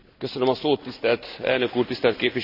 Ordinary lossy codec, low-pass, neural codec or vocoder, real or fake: none; 5.4 kHz; none; real